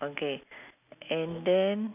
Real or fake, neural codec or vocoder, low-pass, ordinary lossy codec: real; none; 3.6 kHz; none